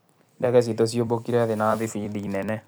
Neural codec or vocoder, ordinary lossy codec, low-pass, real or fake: vocoder, 44.1 kHz, 128 mel bands every 256 samples, BigVGAN v2; none; none; fake